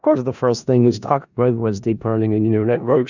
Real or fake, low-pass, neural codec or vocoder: fake; 7.2 kHz; codec, 16 kHz in and 24 kHz out, 0.4 kbps, LongCat-Audio-Codec, four codebook decoder